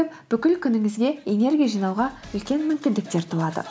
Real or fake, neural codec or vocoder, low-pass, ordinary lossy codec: real; none; none; none